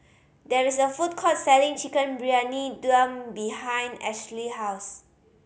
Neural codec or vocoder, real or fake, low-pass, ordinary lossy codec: none; real; none; none